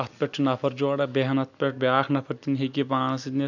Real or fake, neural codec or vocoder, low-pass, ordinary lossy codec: real; none; 7.2 kHz; none